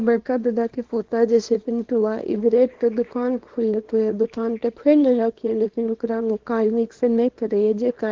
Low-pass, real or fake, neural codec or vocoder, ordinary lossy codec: 7.2 kHz; fake; codec, 24 kHz, 0.9 kbps, WavTokenizer, small release; Opus, 16 kbps